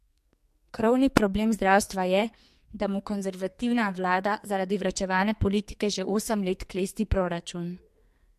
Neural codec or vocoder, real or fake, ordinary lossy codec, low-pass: codec, 44.1 kHz, 2.6 kbps, SNAC; fake; MP3, 64 kbps; 14.4 kHz